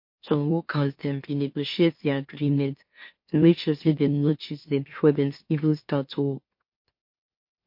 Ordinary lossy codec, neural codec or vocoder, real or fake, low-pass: MP3, 32 kbps; autoencoder, 44.1 kHz, a latent of 192 numbers a frame, MeloTTS; fake; 5.4 kHz